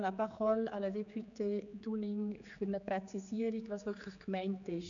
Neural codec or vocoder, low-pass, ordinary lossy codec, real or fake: codec, 16 kHz, 4 kbps, X-Codec, HuBERT features, trained on general audio; 7.2 kHz; none; fake